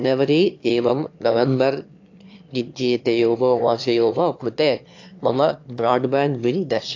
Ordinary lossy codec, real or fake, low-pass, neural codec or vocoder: AAC, 48 kbps; fake; 7.2 kHz; autoencoder, 22.05 kHz, a latent of 192 numbers a frame, VITS, trained on one speaker